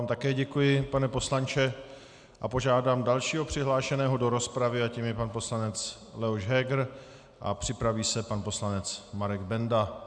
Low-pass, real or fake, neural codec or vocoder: 9.9 kHz; real; none